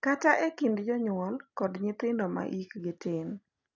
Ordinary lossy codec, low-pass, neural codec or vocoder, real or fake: none; 7.2 kHz; none; real